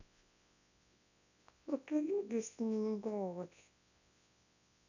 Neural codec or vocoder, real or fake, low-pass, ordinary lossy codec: codec, 24 kHz, 0.9 kbps, WavTokenizer, large speech release; fake; 7.2 kHz; none